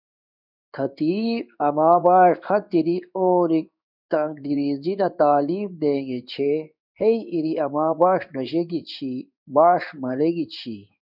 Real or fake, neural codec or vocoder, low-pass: fake; codec, 16 kHz in and 24 kHz out, 1 kbps, XY-Tokenizer; 5.4 kHz